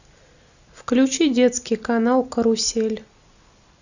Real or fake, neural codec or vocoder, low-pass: real; none; 7.2 kHz